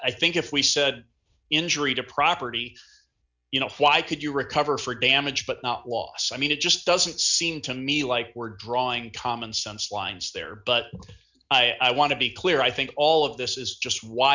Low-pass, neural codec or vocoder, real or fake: 7.2 kHz; none; real